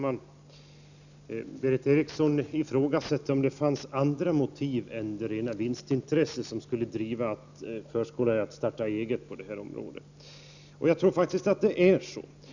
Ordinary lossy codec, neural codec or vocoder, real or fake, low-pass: none; none; real; 7.2 kHz